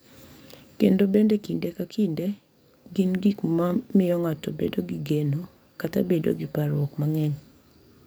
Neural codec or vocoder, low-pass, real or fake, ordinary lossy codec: codec, 44.1 kHz, 7.8 kbps, DAC; none; fake; none